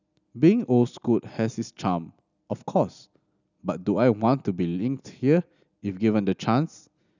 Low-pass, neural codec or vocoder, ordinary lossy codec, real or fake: 7.2 kHz; none; none; real